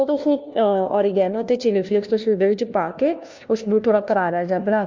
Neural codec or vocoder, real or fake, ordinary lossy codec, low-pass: codec, 16 kHz, 1 kbps, FunCodec, trained on LibriTTS, 50 frames a second; fake; MP3, 64 kbps; 7.2 kHz